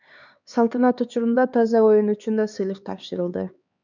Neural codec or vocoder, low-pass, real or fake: codec, 16 kHz, 4 kbps, X-Codec, HuBERT features, trained on LibriSpeech; 7.2 kHz; fake